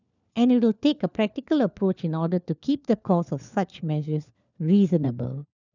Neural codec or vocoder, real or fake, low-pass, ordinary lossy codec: codec, 16 kHz, 4 kbps, FunCodec, trained on LibriTTS, 50 frames a second; fake; 7.2 kHz; none